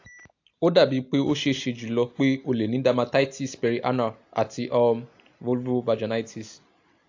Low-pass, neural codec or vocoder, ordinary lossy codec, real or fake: 7.2 kHz; none; AAC, 48 kbps; real